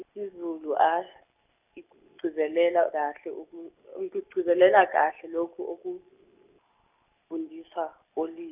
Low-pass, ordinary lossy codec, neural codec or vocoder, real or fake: 3.6 kHz; none; none; real